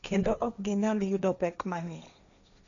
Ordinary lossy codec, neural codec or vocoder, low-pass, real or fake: none; codec, 16 kHz, 1.1 kbps, Voila-Tokenizer; 7.2 kHz; fake